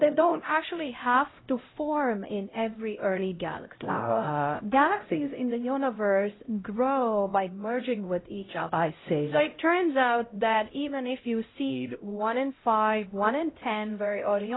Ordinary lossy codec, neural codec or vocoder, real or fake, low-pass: AAC, 16 kbps; codec, 16 kHz, 0.5 kbps, X-Codec, HuBERT features, trained on LibriSpeech; fake; 7.2 kHz